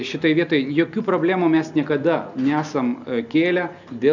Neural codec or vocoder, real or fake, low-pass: none; real; 7.2 kHz